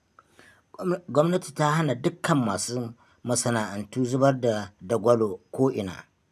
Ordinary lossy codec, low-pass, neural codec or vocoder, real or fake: none; 14.4 kHz; none; real